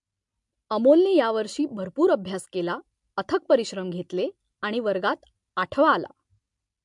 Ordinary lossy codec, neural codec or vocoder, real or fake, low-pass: MP3, 64 kbps; none; real; 10.8 kHz